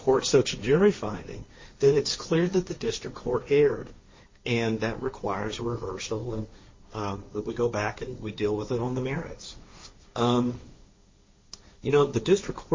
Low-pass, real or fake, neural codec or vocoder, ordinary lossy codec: 7.2 kHz; fake; codec, 16 kHz, 1.1 kbps, Voila-Tokenizer; MP3, 32 kbps